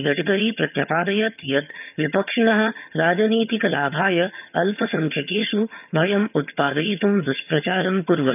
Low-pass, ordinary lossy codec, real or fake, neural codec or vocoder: 3.6 kHz; none; fake; vocoder, 22.05 kHz, 80 mel bands, HiFi-GAN